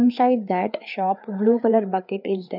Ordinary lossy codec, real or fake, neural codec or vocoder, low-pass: none; fake; codec, 16 kHz, 4 kbps, FreqCodec, larger model; 5.4 kHz